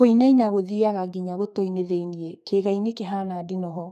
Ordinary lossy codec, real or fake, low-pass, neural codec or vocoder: none; fake; 14.4 kHz; codec, 32 kHz, 1.9 kbps, SNAC